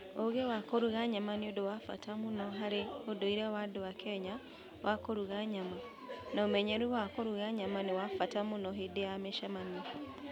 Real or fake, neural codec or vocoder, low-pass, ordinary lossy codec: real; none; 19.8 kHz; none